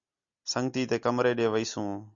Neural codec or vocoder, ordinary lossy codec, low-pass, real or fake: none; Opus, 64 kbps; 7.2 kHz; real